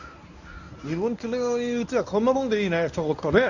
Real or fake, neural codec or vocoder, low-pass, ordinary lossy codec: fake; codec, 24 kHz, 0.9 kbps, WavTokenizer, medium speech release version 1; 7.2 kHz; none